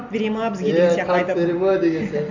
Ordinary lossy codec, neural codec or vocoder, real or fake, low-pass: none; none; real; 7.2 kHz